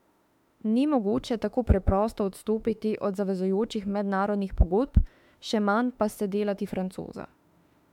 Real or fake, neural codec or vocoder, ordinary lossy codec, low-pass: fake; autoencoder, 48 kHz, 32 numbers a frame, DAC-VAE, trained on Japanese speech; MP3, 96 kbps; 19.8 kHz